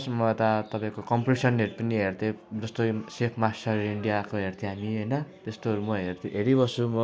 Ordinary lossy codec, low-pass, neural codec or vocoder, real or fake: none; none; none; real